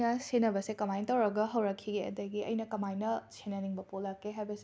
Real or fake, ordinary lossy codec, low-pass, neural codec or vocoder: real; none; none; none